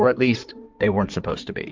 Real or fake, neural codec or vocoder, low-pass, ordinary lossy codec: fake; codec, 16 kHz in and 24 kHz out, 2.2 kbps, FireRedTTS-2 codec; 7.2 kHz; Opus, 24 kbps